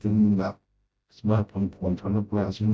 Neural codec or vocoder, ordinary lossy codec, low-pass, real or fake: codec, 16 kHz, 0.5 kbps, FreqCodec, smaller model; none; none; fake